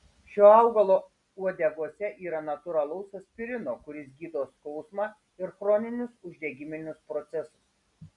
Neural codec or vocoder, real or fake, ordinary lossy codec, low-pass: none; real; MP3, 96 kbps; 10.8 kHz